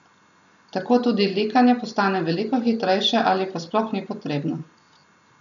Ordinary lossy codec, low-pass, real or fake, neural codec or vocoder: none; 7.2 kHz; real; none